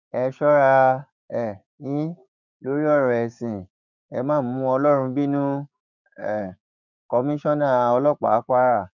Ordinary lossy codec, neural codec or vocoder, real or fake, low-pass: none; codec, 16 kHz, 6 kbps, DAC; fake; 7.2 kHz